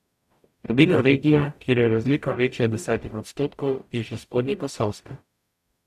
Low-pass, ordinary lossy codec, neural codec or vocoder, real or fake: 14.4 kHz; AAC, 96 kbps; codec, 44.1 kHz, 0.9 kbps, DAC; fake